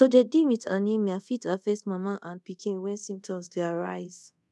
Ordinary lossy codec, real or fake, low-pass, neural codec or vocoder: none; fake; none; codec, 24 kHz, 0.5 kbps, DualCodec